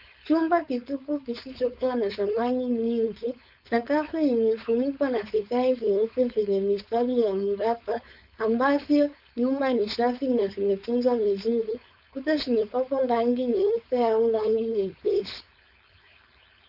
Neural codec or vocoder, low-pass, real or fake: codec, 16 kHz, 4.8 kbps, FACodec; 5.4 kHz; fake